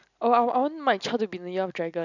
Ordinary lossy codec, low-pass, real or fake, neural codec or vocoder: none; 7.2 kHz; real; none